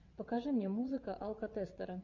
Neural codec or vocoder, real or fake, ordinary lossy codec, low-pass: vocoder, 22.05 kHz, 80 mel bands, WaveNeXt; fake; Opus, 64 kbps; 7.2 kHz